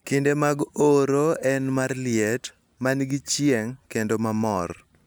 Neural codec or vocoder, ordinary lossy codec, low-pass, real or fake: none; none; none; real